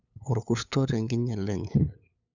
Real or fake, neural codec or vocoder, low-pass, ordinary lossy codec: fake; codec, 16 kHz, 8 kbps, FunCodec, trained on LibriTTS, 25 frames a second; 7.2 kHz; none